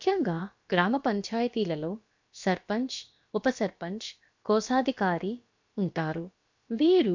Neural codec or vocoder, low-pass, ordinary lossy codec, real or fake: codec, 16 kHz, about 1 kbps, DyCAST, with the encoder's durations; 7.2 kHz; MP3, 64 kbps; fake